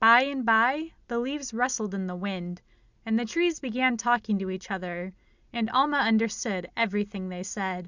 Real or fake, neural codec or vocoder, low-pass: real; none; 7.2 kHz